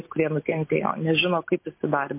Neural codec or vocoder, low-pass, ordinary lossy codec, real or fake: none; 3.6 kHz; MP3, 24 kbps; real